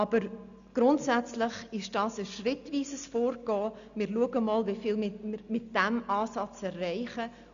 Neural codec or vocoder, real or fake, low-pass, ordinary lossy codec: none; real; 7.2 kHz; none